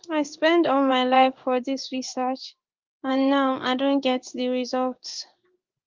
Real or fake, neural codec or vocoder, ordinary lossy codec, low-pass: fake; codec, 16 kHz in and 24 kHz out, 1 kbps, XY-Tokenizer; Opus, 24 kbps; 7.2 kHz